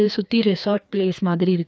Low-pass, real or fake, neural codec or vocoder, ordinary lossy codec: none; fake; codec, 16 kHz, 2 kbps, FreqCodec, larger model; none